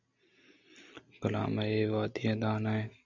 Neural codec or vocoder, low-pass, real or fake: none; 7.2 kHz; real